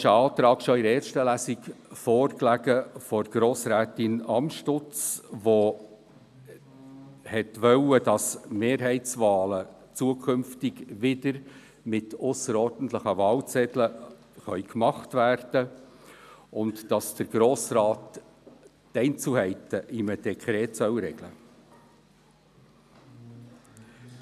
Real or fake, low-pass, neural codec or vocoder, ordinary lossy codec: real; 14.4 kHz; none; none